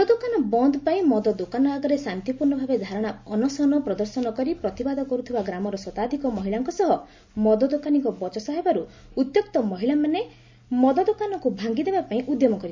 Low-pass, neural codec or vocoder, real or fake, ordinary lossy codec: 7.2 kHz; none; real; MP3, 64 kbps